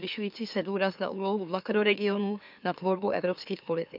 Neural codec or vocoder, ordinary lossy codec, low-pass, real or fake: autoencoder, 44.1 kHz, a latent of 192 numbers a frame, MeloTTS; none; 5.4 kHz; fake